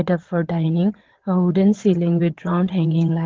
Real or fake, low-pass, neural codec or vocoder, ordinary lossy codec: fake; 7.2 kHz; vocoder, 22.05 kHz, 80 mel bands, WaveNeXt; Opus, 16 kbps